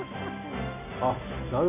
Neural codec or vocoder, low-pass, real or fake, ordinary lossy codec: none; 3.6 kHz; real; none